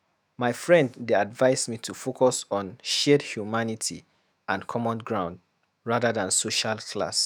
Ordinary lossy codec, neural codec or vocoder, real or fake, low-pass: none; autoencoder, 48 kHz, 128 numbers a frame, DAC-VAE, trained on Japanese speech; fake; none